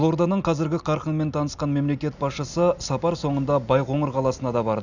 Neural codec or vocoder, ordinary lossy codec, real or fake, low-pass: none; none; real; 7.2 kHz